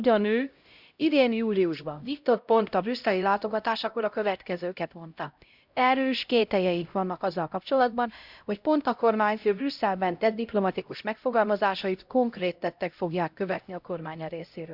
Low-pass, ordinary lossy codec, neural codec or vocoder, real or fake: 5.4 kHz; Opus, 64 kbps; codec, 16 kHz, 0.5 kbps, X-Codec, HuBERT features, trained on LibriSpeech; fake